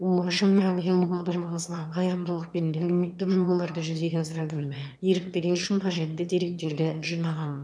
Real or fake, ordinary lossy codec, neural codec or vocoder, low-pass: fake; none; autoencoder, 22.05 kHz, a latent of 192 numbers a frame, VITS, trained on one speaker; none